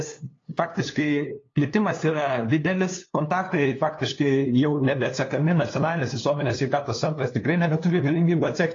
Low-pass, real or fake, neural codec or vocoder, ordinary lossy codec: 7.2 kHz; fake; codec, 16 kHz, 2 kbps, FunCodec, trained on LibriTTS, 25 frames a second; AAC, 32 kbps